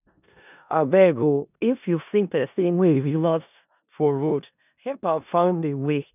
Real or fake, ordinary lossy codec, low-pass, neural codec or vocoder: fake; none; 3.6 kHz; codec, 16 kHz in and 24 kHz out, 0.4 kbps, LongCat-Audio-Codec, four codebook decoder